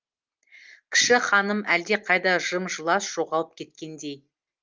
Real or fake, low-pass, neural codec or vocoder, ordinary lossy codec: real; 7.2 kHz; none; Opus, 32 kbps